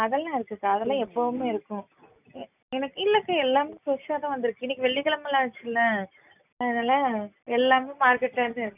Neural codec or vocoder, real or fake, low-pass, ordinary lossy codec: none; real; 3.6 kHz; none